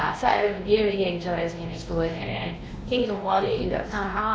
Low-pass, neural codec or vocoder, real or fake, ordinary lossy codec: none; codec, 16 kHz, 1 kbps, X-Codec, WavLM features, trained on Multilingual LibriSpeech; fake; none